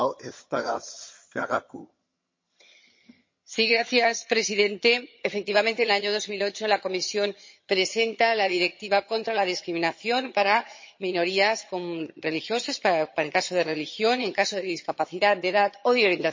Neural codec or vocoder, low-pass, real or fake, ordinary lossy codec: vocoder, 22.05 kHz, 80 mel bands, HiFi-GAN; 7.2 kHz; fake; MP3, 32 kbps